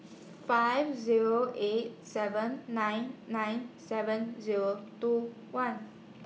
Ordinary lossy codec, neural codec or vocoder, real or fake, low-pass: none; none; real; none